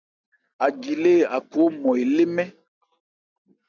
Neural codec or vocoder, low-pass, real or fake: none; 7.2 kHz; real